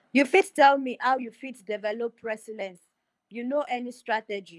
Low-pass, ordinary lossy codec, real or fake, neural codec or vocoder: none; none; fake; codec, 24 kHz, 6 kbps, HILCodec